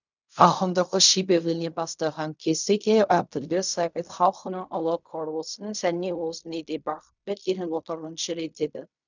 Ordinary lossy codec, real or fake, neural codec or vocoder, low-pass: none; fake; codec, 16 kHz in and 24 kHz out, 0.4 kbps, LongCat-Audio-Codec, fine tuned four codebook decoder; 7.2 kHz